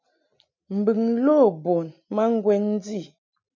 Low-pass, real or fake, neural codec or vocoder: 7.2 kHz; real; none